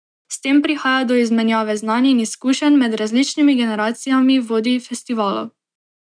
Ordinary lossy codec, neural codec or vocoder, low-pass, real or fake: none; none; 9.9 kHz; real